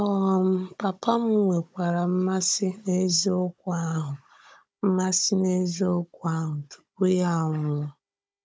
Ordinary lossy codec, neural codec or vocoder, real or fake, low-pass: none; codec, 16 kHz, 16 kbps, FunCodec, trained on Chinese and English, 50 frames a second; fake; none